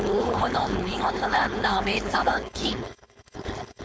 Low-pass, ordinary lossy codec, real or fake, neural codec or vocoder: none; none; fake; codec, 16 kHz, 4.8 kbps, FACodec